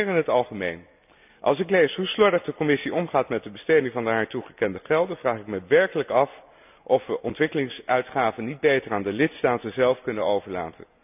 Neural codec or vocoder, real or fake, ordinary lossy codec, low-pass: none; real; none; 3.6 kHz